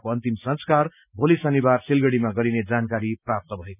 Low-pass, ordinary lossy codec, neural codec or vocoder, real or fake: 3.6 kHz; none; none; real